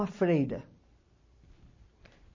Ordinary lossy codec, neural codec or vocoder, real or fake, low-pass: MP3, 32 kbps; vocoder, 44.1 kHz, 128 mel bands every 256 samples, BigVGAN v2; fake; 7.2 kHz